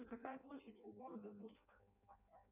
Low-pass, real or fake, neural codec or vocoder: 3.6 kHz; fake; codec, 16 kHz in and 24 kHz out, 0.6 kbps, FireRedTTS-2 codec